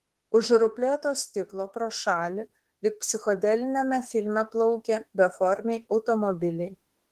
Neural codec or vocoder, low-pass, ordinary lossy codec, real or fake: autoencoder, 48 kHz, 32 numbers a frame, DAC-VAE, trained on Japanese speech; 14.4 kHz; Opus, 16 kbps; fake